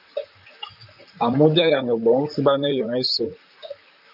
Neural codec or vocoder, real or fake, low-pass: vocoder, 44.1 kHz, 128 mel bands, Pupu-Vocoder; fake; 5.4 kHz